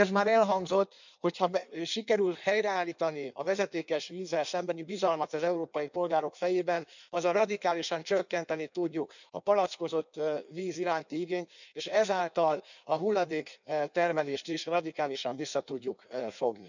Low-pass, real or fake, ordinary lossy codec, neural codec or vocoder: 7.2 kHz; fake; none; codec, 16 kHz in and 24 kHz out, 1.1 kbps, FireRedTTS-2 codec